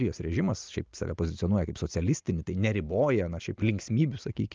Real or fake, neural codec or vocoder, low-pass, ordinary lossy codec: real; none; 7.2 kHz; Opus, 24 kbps